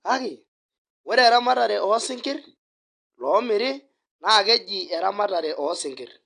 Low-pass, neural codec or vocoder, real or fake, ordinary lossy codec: 9.9 kHz; none; real; AAC, 48 kbps